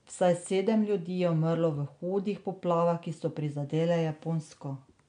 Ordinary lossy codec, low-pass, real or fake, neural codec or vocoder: MP3, 64 kbps; 9.9 kHz; real; none